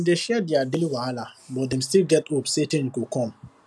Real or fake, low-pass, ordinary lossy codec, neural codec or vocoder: real; none; none; none